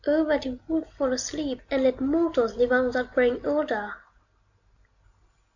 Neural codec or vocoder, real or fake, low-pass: none; real; 7.2 kHz